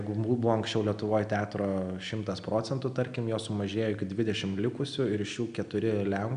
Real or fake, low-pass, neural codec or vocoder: real; 9.9 kHz; none